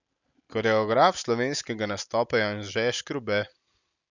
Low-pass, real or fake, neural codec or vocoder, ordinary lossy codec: 7.2 kHz; real; none; none